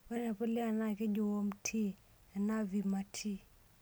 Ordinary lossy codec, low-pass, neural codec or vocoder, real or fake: none; none; none; real